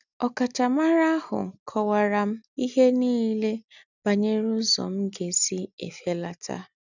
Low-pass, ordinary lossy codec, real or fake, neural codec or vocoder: 7.2 kHz; none; real; none